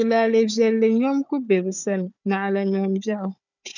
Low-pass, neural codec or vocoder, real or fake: 7.2 kHz; codec, 16 kHz, 4 kbps, FunCodec, trained on Chinese and English, 50 frames a second; fake